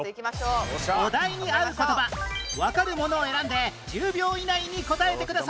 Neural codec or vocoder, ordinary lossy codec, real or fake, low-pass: none; none; real; none